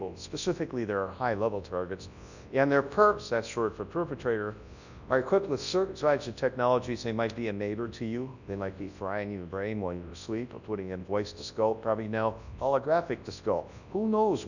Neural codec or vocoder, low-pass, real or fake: codec, 24 kHz, 0.9 kbps, WavTokenizer, large speech release; 7.2 kHz; fake